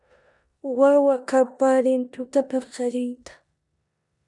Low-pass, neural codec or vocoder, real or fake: 10.8 kHz; codec, 16 kHz in and 24 kHz out, 0.9 kbps, LongCat-Audio-Codec, four codebook decoder; fake